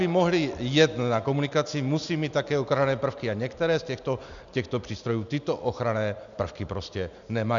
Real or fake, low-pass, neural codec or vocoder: real; 7.2 kHz; none